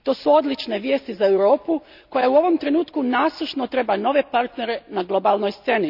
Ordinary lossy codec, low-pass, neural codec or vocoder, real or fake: none; 5.4 kHz; none; real